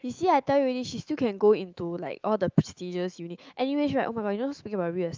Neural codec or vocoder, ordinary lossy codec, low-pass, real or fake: autoencoder, 48 kHz, 128 numbers a frame, DAC-VAE, trained on Japanese speech; Opus, 24 kbps; 7.2 kHz; fake